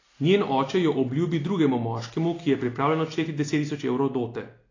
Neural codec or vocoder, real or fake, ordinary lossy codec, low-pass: none; real; AAC, 32 kbps; 7.2 kHz